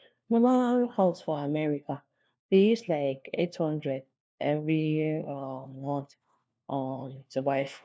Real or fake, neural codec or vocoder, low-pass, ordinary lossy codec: fake; codec, 16 kHz, 1 kbps, FunCodec, trained on LibriTTS, 50 frames a second; none; none